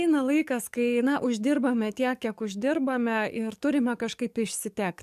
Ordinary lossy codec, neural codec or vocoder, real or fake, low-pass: MP3, 96 kbps; codec, 44.1 kHz, 7.8 kbps, DAC; fake; 14.4 kHz